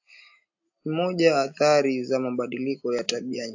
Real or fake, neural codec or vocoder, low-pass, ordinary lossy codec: real; none; 7.2 kHz; AAC, 48 kbps